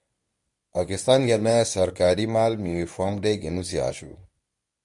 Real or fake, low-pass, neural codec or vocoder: fake; 10.8 kHz; codec, 24 kHz, 0.9 kbps, WavTokenizer, medium speech release version 1